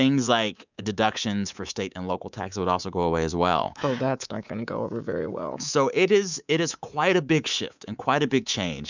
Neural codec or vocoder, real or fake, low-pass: codec, 24 kHz, 3.1 kbps, DualCodec; fake; 7.2 kHz